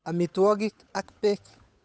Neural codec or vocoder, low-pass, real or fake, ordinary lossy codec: codec, 16 kHz, 8 kbps, FunCodec, trained on Chinese and English, 25 frames a second; none; fake; none